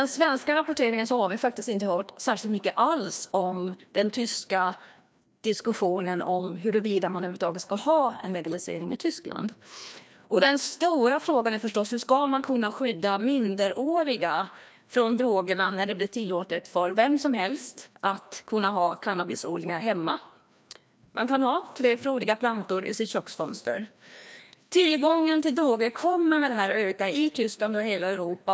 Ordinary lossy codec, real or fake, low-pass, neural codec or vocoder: none; fake; none; codec, 16 kHz, 1 kbps, FreqCodec, larger model